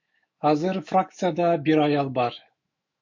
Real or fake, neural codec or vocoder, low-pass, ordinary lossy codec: fake; autoencoder, 48 kHz, 128 numbers a frame, DAC-VAE, trained on Japanese speech; 7.2 kHz; MP3, 48 kbps